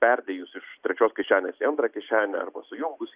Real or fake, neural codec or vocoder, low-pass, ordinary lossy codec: real; none; 3.6 kHz; Opus, 64 kbps